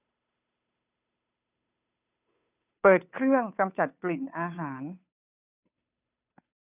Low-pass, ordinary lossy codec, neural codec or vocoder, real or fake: 3.6 kHz; none; codec, 16 kHz, 2 kbps, FunCodec, trained on Chinese and English, 25 frames a second; fake